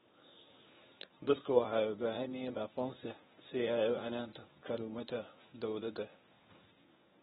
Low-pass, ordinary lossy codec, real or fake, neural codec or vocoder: 10.8 kHz; AAC, 16 kbps; fake; codec, 24 kHz, 0.9 kbps, WavTokenizer, medium speech release version 1